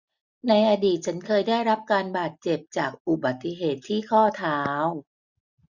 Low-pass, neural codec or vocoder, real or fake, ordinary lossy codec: 7.2 kHz; none; real; none